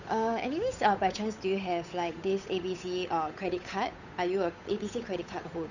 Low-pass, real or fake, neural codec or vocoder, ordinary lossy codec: 7.2 kHz; fake; codec, 16 kHz, 8 kbps, FunCodec, trained on Chinese and English, 25 frames a second; none